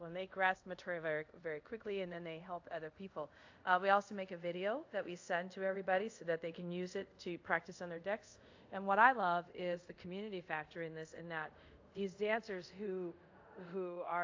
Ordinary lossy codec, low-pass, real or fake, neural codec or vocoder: AAC, 48 kbps; 7.2 kHz; fake; codec, 24 kHz, 0.5 kbps, DualCodec